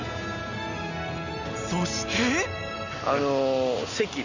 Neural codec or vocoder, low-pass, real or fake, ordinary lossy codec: none; 7.2 kHz; real; none